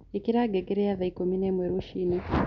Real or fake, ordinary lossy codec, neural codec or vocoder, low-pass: real; none; none; 7.2 kHz